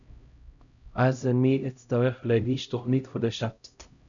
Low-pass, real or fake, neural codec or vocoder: 7.2 kHz; fake; codec, 16 kHz, 0.5 kbps, X-Codec, HuBERT features, trained on LibriSpeech